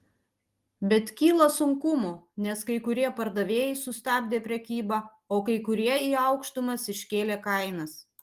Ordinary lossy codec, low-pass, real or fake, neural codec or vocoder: Opus, 24 kbps; 14.4 kHz; real; none